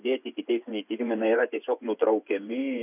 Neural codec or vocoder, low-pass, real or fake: vocoder, 24 kHz, 100 mel bands, Vocos; 3.6 kHz; fake